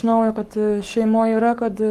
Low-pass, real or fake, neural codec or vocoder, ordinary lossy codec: 14.4 kHz; fake; codec, 44.1 kHz, 7.8 kbps, Pupu-Codec; Opus, 32 kbps